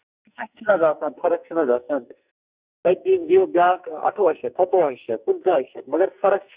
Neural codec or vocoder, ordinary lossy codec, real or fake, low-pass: codec, 44.1 kHz, 2.6 kbps, SNAC; Opus, 64 kbps; fake; 3.6 kHz